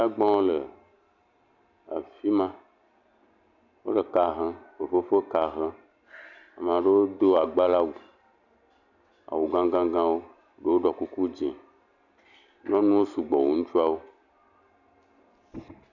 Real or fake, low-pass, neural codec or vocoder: real; 7.2 kHz; none